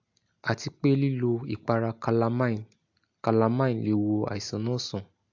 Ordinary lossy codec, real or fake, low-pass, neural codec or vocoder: AAC, 48 kbps; real; 7.2 kHz; none